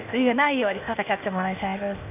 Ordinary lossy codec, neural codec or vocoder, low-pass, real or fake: none; codec, 16 kHz, 0.8 kbps, ZipCodec; 3.6 kHz; fake